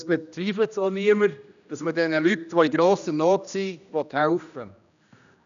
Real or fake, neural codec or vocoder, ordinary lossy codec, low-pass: fake; codec, 16 kHz, 1 kbps, X-Codec, HuBERT features, trained on general audio; none; 7.2 kHz